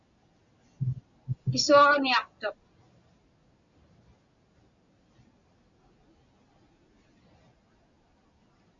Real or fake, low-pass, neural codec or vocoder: real; 7.2 kHz; none